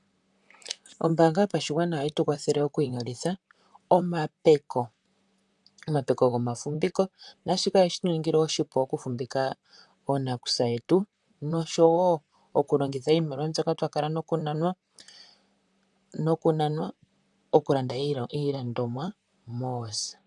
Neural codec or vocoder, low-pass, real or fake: vocoder, 44.1 kHz, 128 mel bands, Pupu-Vocoder; 10.8 kHz; fake